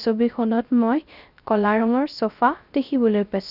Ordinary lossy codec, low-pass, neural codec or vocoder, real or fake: none; 5.4 kHz; codec, 16 kHz, 0.3 kbps, FocalCodec; fake